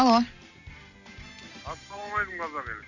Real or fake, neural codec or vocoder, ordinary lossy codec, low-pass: real; none; none; 7.2 kHz